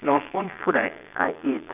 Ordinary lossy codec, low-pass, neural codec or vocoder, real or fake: none; 3.6 kHz; vocoder, 22.05 kHz, 80 mel bands, Vocos; fake